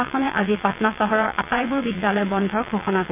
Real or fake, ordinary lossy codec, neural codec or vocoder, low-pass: fake; none; vocoder, 22.05 kHz, 80 mel bands, WaveNeXt; 3.6 kHz